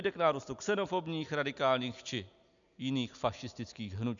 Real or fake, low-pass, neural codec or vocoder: real; 7.2 kHz; none